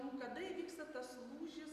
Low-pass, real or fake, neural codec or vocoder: 14.4 kHz; real; none